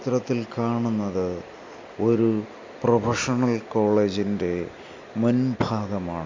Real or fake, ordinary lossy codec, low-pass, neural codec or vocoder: real; MP3, 48 kbps; 7.2 kHz; none